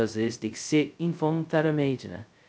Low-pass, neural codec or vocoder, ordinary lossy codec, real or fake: none; codec, 16 kHz, 0.2 kbps, FocalCodec; none; fake